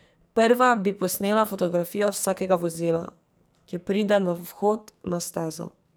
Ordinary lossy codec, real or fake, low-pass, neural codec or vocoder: none; fake; none; codec, 44.1 kHz, 2.6 kbps, SNAC